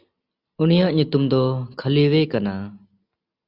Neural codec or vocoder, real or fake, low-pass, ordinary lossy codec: none; real; 5.4 kHz; Opus, 64 kbps